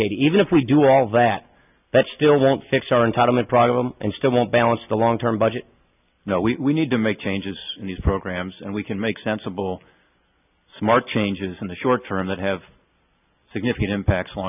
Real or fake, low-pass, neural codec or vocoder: real; 3.6 kHz; none